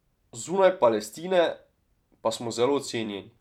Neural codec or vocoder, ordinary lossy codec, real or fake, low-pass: vocoder, 44.1 kHz, 128 mel bands every 256 samples, BigVGAN v2; none; fake; 19.8 kHz